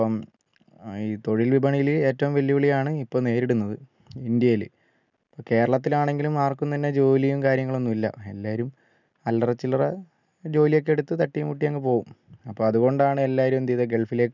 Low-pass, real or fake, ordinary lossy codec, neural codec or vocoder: 7.2 kHz; real; none; none